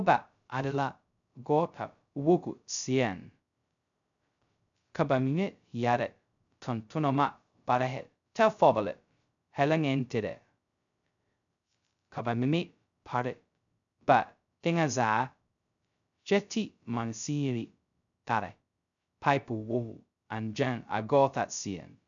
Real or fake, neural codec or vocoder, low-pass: fake; codec, 16 kHz, 0.2 kbps, FocalCodec; 7.2 kHz